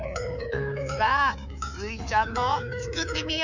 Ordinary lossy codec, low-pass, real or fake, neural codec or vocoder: none; 7.2 kHz; fake; codec, 24 kHz, 3.1 kbps, DualCodec